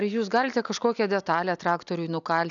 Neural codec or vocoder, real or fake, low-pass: none; real; 7.2 kHz